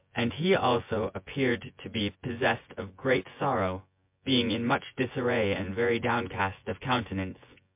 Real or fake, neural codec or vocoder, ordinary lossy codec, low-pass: fake; vocoder, 24 kHz, 100 mel bands, Vocos; MP3, 32 kbps; 3.6 kHz